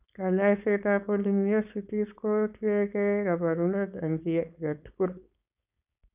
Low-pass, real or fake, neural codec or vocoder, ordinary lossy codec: 3.6 kHz; fake; codec, 16 kHz, 4.8 kbps, FACodec; none